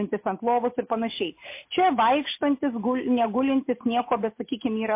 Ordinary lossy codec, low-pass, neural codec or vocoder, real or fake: MP3, 24 kbps; 3.6 kHz; none; real